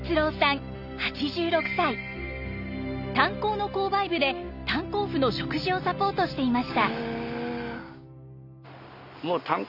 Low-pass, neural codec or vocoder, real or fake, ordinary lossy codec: 5.4 kHz; none; real; none